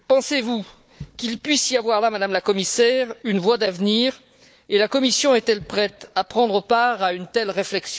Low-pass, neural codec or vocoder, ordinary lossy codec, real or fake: none; codec, 16 kHz, 4 kbps, FunCodec, trained on Chinese and English, 50 frames a second; none; fake